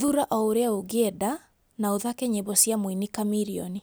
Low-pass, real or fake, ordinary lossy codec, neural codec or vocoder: none; real; none; none